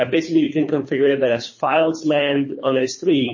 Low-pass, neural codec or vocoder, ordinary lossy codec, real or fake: 7.2 kHz; codec, 24 kHz, 3 kbps, HILCodec; MP3, 32 kbps; fake